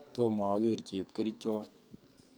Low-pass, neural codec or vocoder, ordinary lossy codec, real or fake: none; codec, 44.1 kHz, 2.6 kbps, SNAC; none; fake